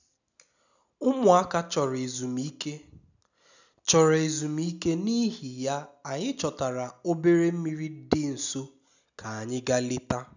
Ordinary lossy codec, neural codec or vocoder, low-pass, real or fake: none; none; 7.2 kHz; real